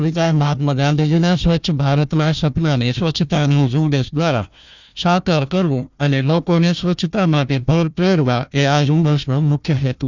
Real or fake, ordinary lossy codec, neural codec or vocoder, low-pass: fake; none; codec, 16 kHz, 1 kbps, FunCodec, trained on Chinese and English, 50 frames a second; 7.2 kHz